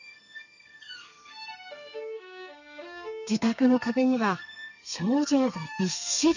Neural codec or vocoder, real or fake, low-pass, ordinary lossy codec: codec, 32 kHz, 1.9 kbps, SNAC; fake; 7.2 kHz; none